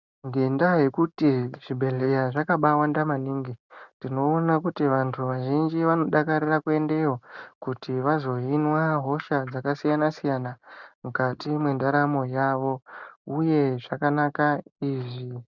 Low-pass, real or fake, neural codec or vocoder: 7.2 kHz; real; none